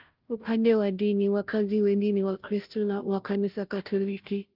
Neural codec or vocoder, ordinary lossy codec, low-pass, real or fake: codec, 16 kHz, 0.5 kbps, FunCodec, trained on Chinese and English, 25 frames a second; Opus, 24 kbps; 5.4 kHz; fake